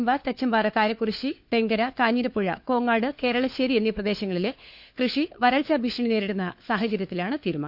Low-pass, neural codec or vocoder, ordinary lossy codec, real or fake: 5.4 kHz; codec, 16 kHz, 4 kbps, FunCodec, trained on LibriTTS, 50 frames a second; none; fake